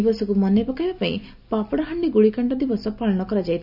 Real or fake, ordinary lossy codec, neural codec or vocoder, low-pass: real; none; none; 5.4 kHz